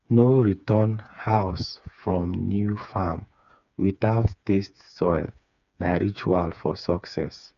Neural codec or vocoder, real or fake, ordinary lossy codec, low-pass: codec, 16 kHz, 4 kbps, FreqCodec, smaller model; fake; none; 7.2 kHz